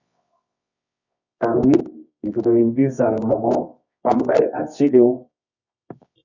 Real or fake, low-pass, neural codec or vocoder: fake; 7.2 kHz; codec, 24 kHz, 0.9 kbps, WavTokenizer, medium music audio release